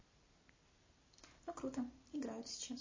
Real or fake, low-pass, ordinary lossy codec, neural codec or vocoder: real; 7.2 kHz; MP3, 32 kbps; none